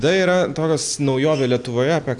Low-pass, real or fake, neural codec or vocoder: 10.8 kHz; real; none